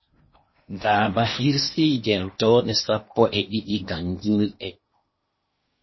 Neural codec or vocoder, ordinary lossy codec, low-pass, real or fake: codec, 16 kHz in and 24 kHz out, 0.8 kbps, FocalCodec, streaming, 65536 codes; MP3, 24 kbps; 7.2 kHz; fake